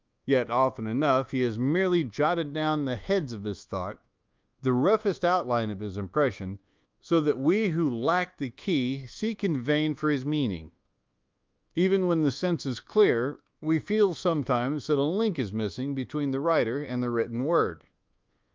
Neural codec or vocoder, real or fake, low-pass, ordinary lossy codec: codec, 24 kHz, 1.2 kbps, DualCodec; fake; 7.2 kHz; Opus, 32 kbps